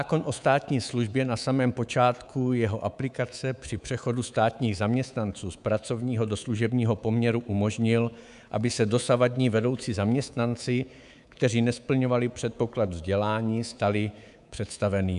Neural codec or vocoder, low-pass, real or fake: codec, 24 kHz, 3.1 kbps, DualCodec; 10.8 kHz; fake